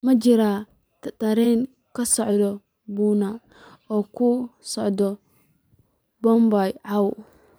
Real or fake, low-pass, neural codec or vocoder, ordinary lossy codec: fake; none; vocoder, 44.1 kHz, 128 mel bands every 512 samples, BigVGAN v2; none